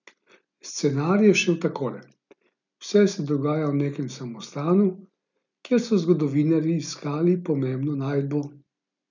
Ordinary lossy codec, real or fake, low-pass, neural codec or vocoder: none; real; 7.2 kHz; none